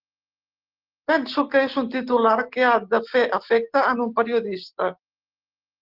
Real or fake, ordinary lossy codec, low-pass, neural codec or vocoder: real; Opus, 16 kbps; 5.4 kHz; none